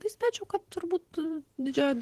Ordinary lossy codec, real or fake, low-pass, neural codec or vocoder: Opus, 16 kbps; fake; 14.4 kHz; vocoder, 48 kHz, 128 mel bands, Vocos